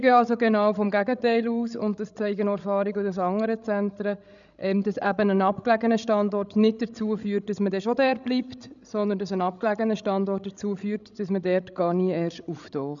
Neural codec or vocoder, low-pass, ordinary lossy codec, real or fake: codec, 16 kHz, 16 kbps, FreqCodec, larger model; 7.2 kHz; none; fake